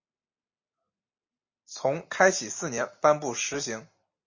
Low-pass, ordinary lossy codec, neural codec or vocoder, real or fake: 7.2 kHz; MP3, 32 kbps; none; real